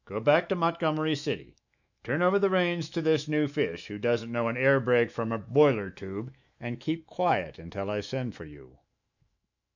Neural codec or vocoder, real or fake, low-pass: autoencoder, 48 kHz, 128 numbers a frame, DAC-VAE, trained on Japanese speech; fake; 7.2 kHz